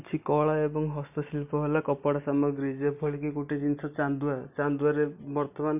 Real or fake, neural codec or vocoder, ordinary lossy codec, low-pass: real; none; none; 3.6 kHz